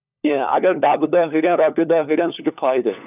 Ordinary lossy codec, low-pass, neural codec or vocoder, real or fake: none; 3.6 kHz; codec, 16 kHz, 4 kbps, FunCodec, trained on LibriTTS, 50 frames a second; fake